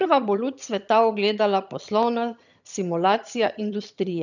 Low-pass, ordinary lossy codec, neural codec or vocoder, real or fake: 7.2 kHz; none; vocoder, 22.05 kHz, 80 mel bands, HiFi-GAN; fake